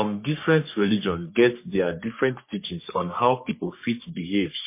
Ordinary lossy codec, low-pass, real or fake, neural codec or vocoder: MP3, 24 kbps; 3.6 kHz; fake; codec, 44.1 kHz, 3.4 kbps, Pupu-Codec